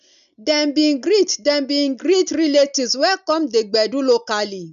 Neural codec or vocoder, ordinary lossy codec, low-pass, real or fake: none; none; 7.2 kHz; real